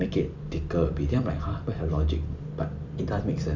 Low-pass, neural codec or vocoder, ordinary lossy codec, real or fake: 7.2 kHz; vocoder, 44.1 kHz, 128 mel bands every 256 samples, BigVGAN v2; none; fake